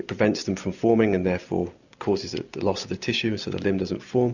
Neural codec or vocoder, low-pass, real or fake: none; 7.2 kHz; real